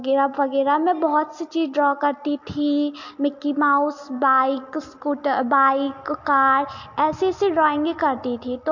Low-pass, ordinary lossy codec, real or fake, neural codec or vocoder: 7.2 kHz; MP3, 48 kbps; real; none